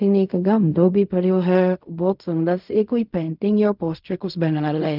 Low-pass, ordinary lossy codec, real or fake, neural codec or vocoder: 5.4 kHz; none; fake; codec, 16 kHz in and 24 kHz out, 0.4 kbps, LongCat-Audio-Codec, fine tuned four codebook decoder